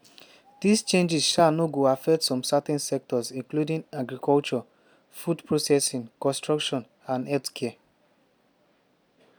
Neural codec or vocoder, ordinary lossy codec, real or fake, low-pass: none; none; real; none